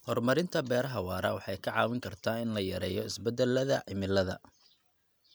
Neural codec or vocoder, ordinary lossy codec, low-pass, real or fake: none; none; none; real